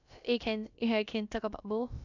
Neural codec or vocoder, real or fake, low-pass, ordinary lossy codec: codec, 16 kHz, about 1 kbps, DyCAST, with the encoder's durations; fake; 7.2 kHz; none